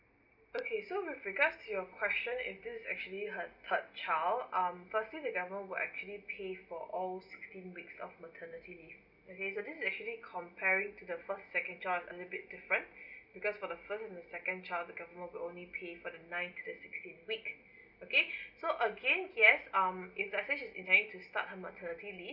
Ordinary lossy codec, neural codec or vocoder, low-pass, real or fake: none; none; 5.4 kHz; real